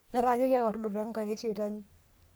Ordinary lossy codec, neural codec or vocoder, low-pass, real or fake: none; codec, 44.1 kHz, 3.4 kbps, Pupu-Codec; none; fake